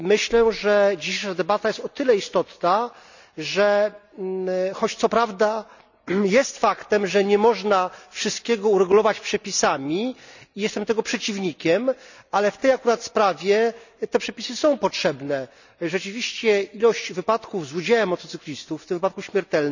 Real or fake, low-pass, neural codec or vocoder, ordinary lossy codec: real; 7.2 kHz; none; none